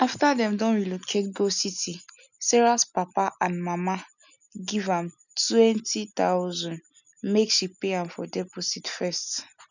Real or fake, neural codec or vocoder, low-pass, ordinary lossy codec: real; none; 7.2 kHz; none